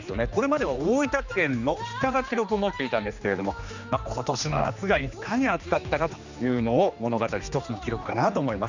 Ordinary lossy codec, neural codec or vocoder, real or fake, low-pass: none; codec, 16 kHz, 2 kbps, X-Codec, HuBERT features, trained on general audio; fake; 7.2 kHz